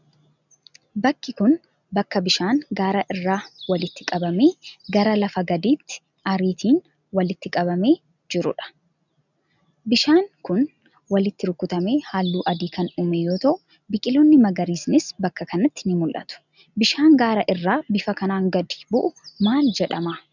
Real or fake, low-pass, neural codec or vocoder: real; 7.2 kHz; none